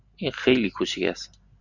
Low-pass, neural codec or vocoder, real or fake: 7.2 kHz; none; real